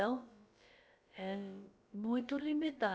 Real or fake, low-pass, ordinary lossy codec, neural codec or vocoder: fake; none; none; codec, 16 kHz, about 1 kbps, DyCAST, with the encoder's durations